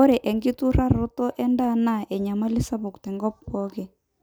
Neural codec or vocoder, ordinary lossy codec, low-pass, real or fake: none; none; none; real